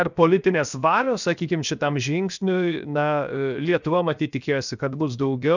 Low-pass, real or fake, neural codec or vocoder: 7.2 kHz; fake; codec, 16 kHz, 0.7 kbps, FocalCodec